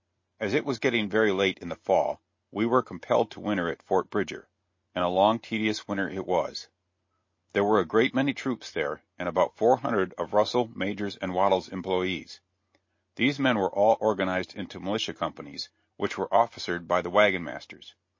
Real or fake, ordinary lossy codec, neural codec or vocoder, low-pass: real; MP3, 32 kbps; none; 7.2 kHz